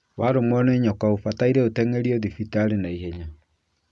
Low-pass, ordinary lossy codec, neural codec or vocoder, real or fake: none; none; none; real